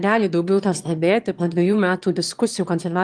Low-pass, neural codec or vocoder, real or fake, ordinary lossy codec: 9.9 kHz; autoencoder, 22.05 kHz, a latent of 192 numbers a frame, VITS, trained on one speaker; fake; Opus, 24 kbps